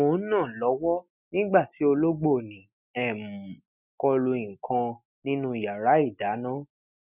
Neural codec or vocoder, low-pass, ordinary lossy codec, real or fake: none; 3.6 kHz; none; real